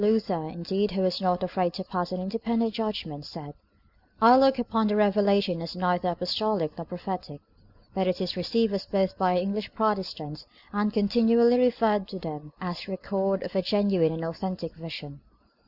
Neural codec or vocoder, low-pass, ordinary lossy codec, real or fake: none; 5.4 kHz; Opus, 64 kbps; real